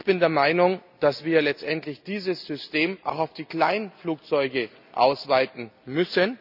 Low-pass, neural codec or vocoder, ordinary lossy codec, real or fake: 5.4 kHz; none; none; real